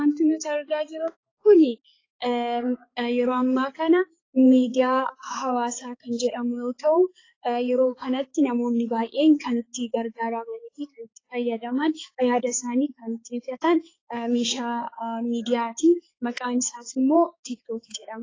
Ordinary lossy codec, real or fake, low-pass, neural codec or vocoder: AAC, 32 kbps; fake; 7.2 kHz; codec, 16 kHz, 4 kbps, X-Codec, HuBERT features, trained on balanced general audio